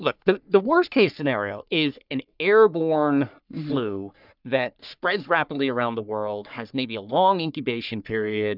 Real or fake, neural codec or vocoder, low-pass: fake; codec, 44.1 kHz, 3.4 kbps, Pupu-Codec; 5.4 kHz